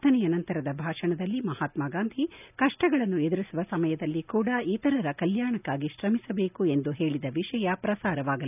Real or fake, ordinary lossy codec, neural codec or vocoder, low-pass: real; none; none; 3.6 kHz